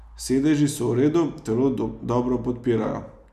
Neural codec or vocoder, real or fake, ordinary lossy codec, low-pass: none; real; none; 14.4 kHz